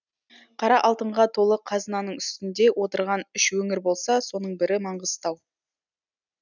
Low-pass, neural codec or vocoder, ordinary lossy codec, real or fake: 7.2 kHz; none; none; real